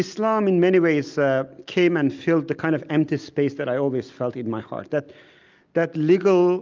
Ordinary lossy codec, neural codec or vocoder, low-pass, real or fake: Opus, 32 kbps; none; 7.2 kHz; real